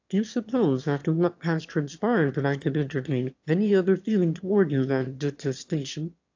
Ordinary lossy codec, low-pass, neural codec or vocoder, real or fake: AAC, 48 kbps; 7.2 kHz; autoencoder, 22.05 kHz, a latent of 192 numbers a frame, VITS, trained on one speaker; fake